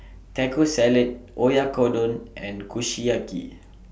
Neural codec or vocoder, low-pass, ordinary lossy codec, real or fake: none; none; none; real